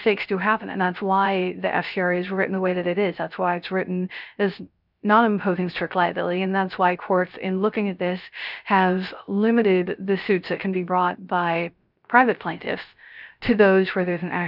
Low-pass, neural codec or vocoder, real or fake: 5.4 kHz; codec, 16 kHz, 0.3 kbps, FocalCodec; fake